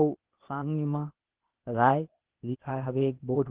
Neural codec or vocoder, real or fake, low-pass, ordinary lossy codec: codec, 16 kHz, 0.8 kbps, ZipCodec; fake; 3.6 kHz; Opus, 16 kbps